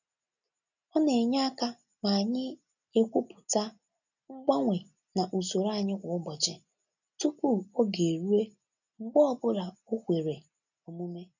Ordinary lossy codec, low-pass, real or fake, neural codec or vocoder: none; 7.2 kHz; real; none